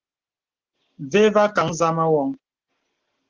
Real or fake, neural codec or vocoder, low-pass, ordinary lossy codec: real; none; 7.2 kHz; Opus, 16 kbps